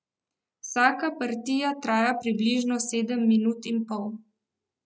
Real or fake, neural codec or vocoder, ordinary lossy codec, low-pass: real; none; none; none